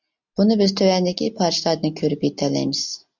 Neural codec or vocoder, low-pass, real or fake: none; 7.2 kHz; real